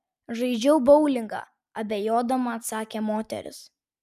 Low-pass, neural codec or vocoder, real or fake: 14.4 kHz; none; real